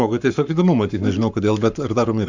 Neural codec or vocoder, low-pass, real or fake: codec, 44.1 kHz, 7.8 kbps, Pupu-Codec; 7.2 kHz; fake